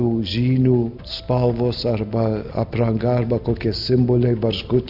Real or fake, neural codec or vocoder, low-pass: real; none; 5.4 kHz